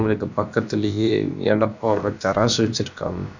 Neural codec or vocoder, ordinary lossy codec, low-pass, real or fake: codec, 16 kHz, about 1 kbps, DyCAST, with the encoder's durations; none; 7.2 kHz; fake